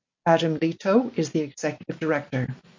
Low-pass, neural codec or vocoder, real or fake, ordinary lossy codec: 7.2 kHz; none; real; MP3, 48 kbps